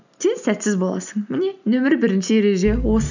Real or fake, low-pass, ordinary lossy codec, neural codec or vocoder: real; 7.2 kHz; none; none